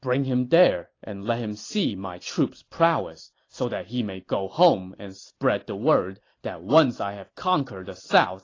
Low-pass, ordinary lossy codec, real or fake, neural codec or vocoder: 7.2 kHz; AAC, 32 kbps; real; none